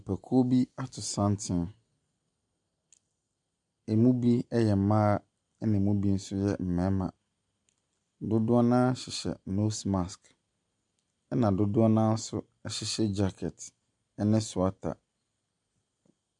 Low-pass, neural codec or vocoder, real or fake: 10.8 kHz; none; real